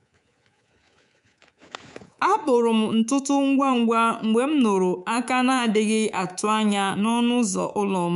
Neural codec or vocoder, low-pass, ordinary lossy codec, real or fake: codec, 24 kHz, 3.1 kbps, DualCodec; 10.8 kHz; none; fake